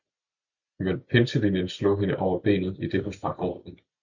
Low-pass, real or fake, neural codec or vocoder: 7.2 kHz; real; none